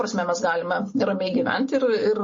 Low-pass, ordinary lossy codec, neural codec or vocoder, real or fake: 7.2 kHz; MP3, 32 kbps; none; real